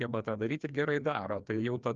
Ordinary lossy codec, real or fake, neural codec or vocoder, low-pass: Opus, 32 kbps; fake; codec, 16 kHz, 2 kbps, FreqCodec, larger model; 7.2 kHz